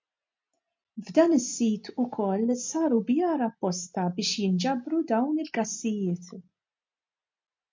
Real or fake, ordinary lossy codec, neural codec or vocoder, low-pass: real; MP3, 48 kbps; none; 7.2 kHz